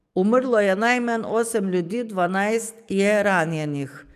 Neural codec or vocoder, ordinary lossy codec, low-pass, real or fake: codec, 44.1 kHz, 7.8 kbps, DAC; none; 14.4 kHz; fake